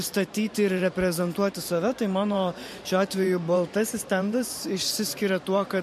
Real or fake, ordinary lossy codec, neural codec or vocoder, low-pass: fake; MP3, 64 kbps; vocoder, 44.1 kHz, 128 mel bands every 512 samples, BigVGAN v2; 14.4 kHz